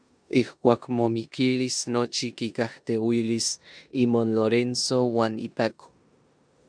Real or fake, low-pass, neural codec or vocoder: fake; 9.9 kHz; codec, 16 kHz in and 24 kHz out, 0.9 kbps, LongCat-Audio-Codec, four codebook decoder